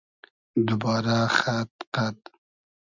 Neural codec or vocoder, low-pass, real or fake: none; 7.2 kHz; real